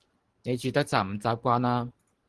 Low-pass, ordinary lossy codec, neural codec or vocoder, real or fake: 10.8 kHz; Opus, 16 kbps; none; real